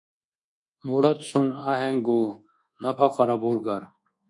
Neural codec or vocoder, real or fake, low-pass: codec, 24 kHz, 1.2 kbps, DualCodec; fake; 10.8 kHz